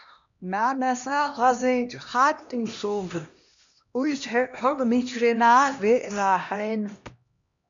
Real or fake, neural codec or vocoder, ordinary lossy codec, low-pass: fake; codec, 16 kHz, 1 kbps, X-Codec, HuBERT features, trained on LibriSpeech; MP3, 64 kbps; 7.2 kHz